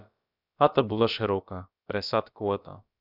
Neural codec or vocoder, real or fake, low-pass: codec, 16 kHz, about 1 kbps, DyCAST, with the encoder's durations; fake; 5.4 kHz